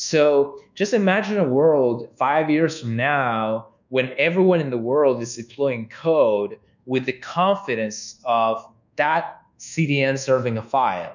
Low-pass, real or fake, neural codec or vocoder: 7.2 kHz; fake; codec, 24 kHz, 1.2 kbps, DualCodec